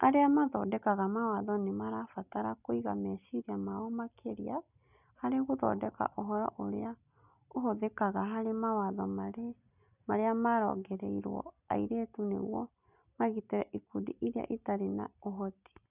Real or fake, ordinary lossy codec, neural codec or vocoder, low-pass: real; none; none; 3.6 kHz